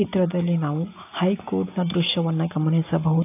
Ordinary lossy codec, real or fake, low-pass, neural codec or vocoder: AAC, 24 kbps; real; 3.6 kHz; none